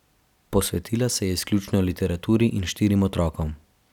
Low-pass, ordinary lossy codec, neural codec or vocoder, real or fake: 19.8 kHz; none; none; real